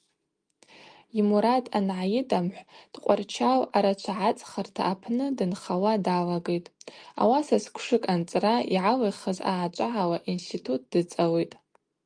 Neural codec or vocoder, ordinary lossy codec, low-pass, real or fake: none; Opus, 32 kbps; 9.9 kHz; real